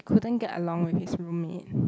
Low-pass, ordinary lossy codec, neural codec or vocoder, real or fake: none; none; none; real